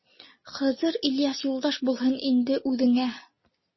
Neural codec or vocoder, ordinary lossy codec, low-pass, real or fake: none; MP3, 24 kbps; 7.2 kHz; real